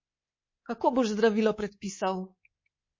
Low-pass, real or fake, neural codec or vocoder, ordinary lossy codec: 7.2 kHz; fake; codec, 24 kHz, 3.1 kbps, DualCodec; MP3, 32 kbps